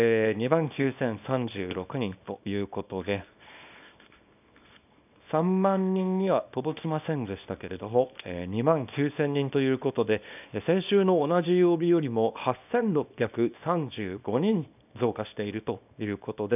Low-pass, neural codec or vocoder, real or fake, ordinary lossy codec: 3.6 kHz; codec, 24 kHz, 0.9 kbps, WavTokenizer, small release; fake; none